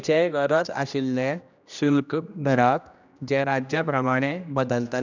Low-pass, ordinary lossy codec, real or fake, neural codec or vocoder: 7.2 kHz; none; fake; codec, 16 kHz, 1 kbps, X-Codec, HuBERT features, trained on general audio